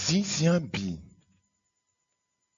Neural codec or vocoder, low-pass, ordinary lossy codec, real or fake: none; 7.2 kHz; MP3, 64 kbps; real